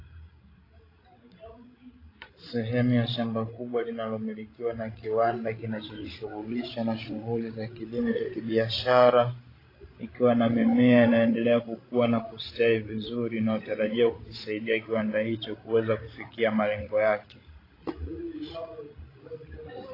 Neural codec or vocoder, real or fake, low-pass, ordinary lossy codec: codec, 16 kHz, 16 kbps, FreqCodec, larger model; fake; 5.4 kHz; AAC, 24 kbps